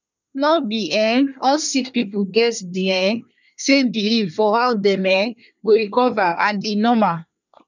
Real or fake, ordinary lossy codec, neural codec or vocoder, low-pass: fake; none; codec, 24 kHz, 1 kbps, SNAC; 7.2 kHz